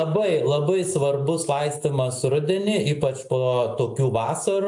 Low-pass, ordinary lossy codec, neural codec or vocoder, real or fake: 10.8 kHz; AAC, 64 kbps; none; real